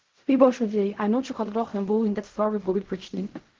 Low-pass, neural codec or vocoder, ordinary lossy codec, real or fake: 7.2 kHz; codec, 16 kHz in and 24 kHz out, 0.4 kbps, LongCat-Audio-Codec, fine tuned four codebook decoder; Opus, 16 kbps; fake